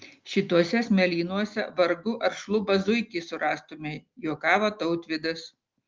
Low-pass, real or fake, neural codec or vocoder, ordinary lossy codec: 7.2 kHz; real; none; Opus, 24 kbps